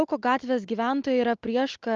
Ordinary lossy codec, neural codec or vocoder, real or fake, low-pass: Opus, 24 kbps; none; real; 7.2 kHz